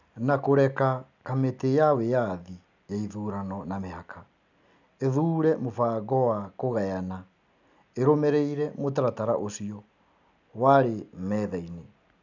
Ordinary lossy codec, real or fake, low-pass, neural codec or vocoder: none; real; 7.2 kHz; none